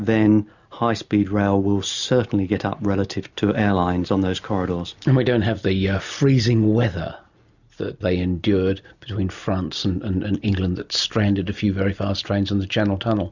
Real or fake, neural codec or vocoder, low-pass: real; none; 7.2 kHz